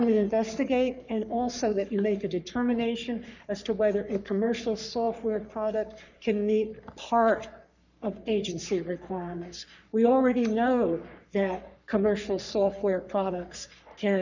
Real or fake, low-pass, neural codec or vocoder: fake; 7.2 kHz; codec, 44.1 kHz, 3.4 kbps, Pupu-Codec